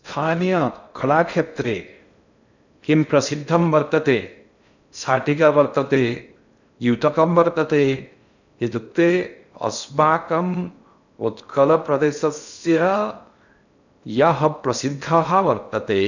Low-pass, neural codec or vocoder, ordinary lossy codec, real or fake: 7.2 kHz; codec, 16 kHz in and 24 kHz out, 0.6 kbps, FocalCodec, streaming, 2048 codes; none; fake